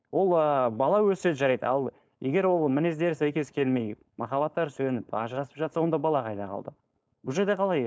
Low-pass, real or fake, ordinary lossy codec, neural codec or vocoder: none; fake; none; codec, 16 kHz, 4.8 kbps, FACodec